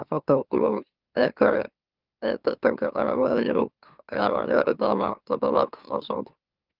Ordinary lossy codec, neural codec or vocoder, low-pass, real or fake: Opus, 32 kbps; autoencoder, 44.1 kHz, a latent of 192 numbers a frame, MeloTTS; 5.4 kHz; fake